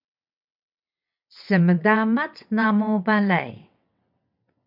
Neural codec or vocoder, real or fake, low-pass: vocoder, 22.05 kHz, 80 mel bands, WaveNeXt; fake; 5.4 kHz